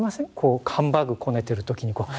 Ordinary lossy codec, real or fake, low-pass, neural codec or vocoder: none; real; none; none